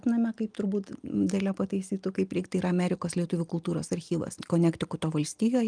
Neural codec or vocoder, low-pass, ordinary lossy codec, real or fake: none; 9.9 kHz; Opus, 32 kbps; real